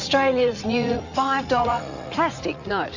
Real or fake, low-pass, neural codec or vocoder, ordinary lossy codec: fake; 7.2 kHz; vocoder, 44.1 kHz, 80 mel bands, Vocos; Opus, 64 kbps